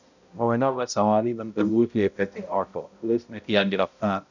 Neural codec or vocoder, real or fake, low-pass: codec, 16 kHz, 0.5 kbps, X-Codec, HuBERT features, trained on balanced general audio; fake; 7.2 kHz